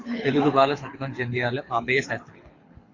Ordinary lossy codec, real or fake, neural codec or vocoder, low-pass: AAC, 32 kbps; fake; codec, 24 kHz, 6 kbps, HILCodec; 7.2 kHz